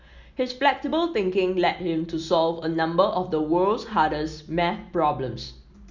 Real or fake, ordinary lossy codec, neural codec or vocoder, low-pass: real; Opus, 64 kbps; none; 7.2 kHz